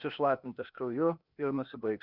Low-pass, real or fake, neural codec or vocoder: 5.4 kHz; fake; codec, 16 kHz, 0.8 kbps, ZipCodec